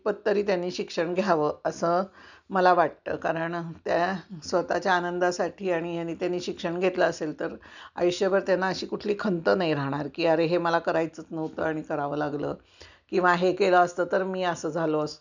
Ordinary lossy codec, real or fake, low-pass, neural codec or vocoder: none; real; 7.2 kHz; none